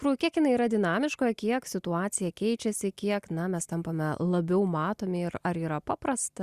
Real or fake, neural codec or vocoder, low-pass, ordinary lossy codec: real; none; 14.4 kHz; Opus, 64 kbps